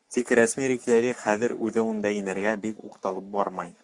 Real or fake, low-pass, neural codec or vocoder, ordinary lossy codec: fake; 10.8 kHz; codec, 44.1 kHz, 3.4 kbps, Pupu-Codec; Opus, 64 kbps